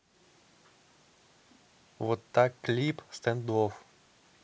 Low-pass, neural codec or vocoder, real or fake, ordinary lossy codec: none; none; real; none